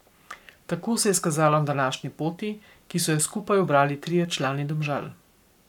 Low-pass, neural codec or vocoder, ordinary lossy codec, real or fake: 19.8 kHz; codec, 44.1 kHz, 7.8 kbps, Pupu-Codec; none; fake